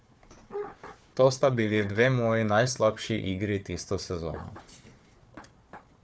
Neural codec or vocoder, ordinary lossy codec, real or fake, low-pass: codec, 16 kHz, 4 kbps, FunCodec, trained on Chinese and English, 50 frames a second; none; fake; none